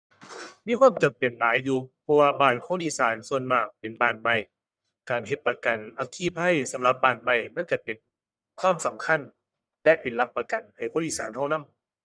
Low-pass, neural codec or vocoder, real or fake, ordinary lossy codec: 9.9 kHz; codec, 44.1 kHz, 1.7 kbps, Pupu-Codec; fake; none